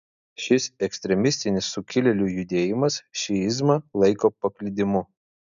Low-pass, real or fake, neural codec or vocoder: 7.2 kHz; real; none